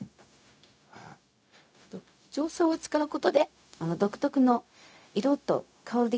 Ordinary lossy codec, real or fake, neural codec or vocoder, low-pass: none; fake; codec, 16 kHz, 0.4 kbps, LongCat-Audio-Codec; none